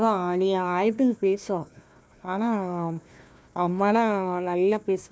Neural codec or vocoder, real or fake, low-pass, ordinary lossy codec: codec, 16 kHz, 1 kbps, FunCodec, trained on Chinese and English, 50 frames a second; fake; none; none